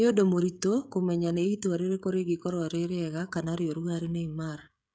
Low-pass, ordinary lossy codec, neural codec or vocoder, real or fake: none; none; codec, 16 kHz, 16 kbps, FreqCodec, smaller model; fake